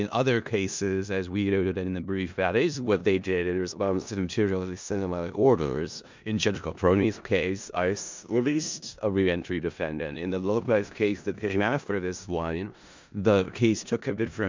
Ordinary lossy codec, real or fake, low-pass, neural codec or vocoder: MP3, 64 kbps; fake; 7.2 kHz; codec, 16 kHz in and 24 kHz out, 0.4 kbps, LongCat-Audio-Codec, four codebook decoder